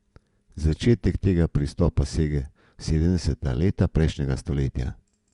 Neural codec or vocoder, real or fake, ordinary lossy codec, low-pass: vocoder, 24 kHz, 100 mel bands, Vocos; fake; Opus, 64 kbps; 10.8 kHz